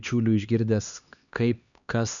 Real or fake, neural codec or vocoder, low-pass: fake; codec, 16 kHz, 2 kbps, X-Codec, HuBERT features, trained on LibriSpeech; 7.2 kHz